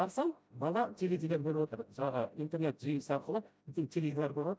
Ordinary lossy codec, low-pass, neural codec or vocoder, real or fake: none; none; codec, 16 kHz, 0.5 kbps, FreqCodec, smaller model; fake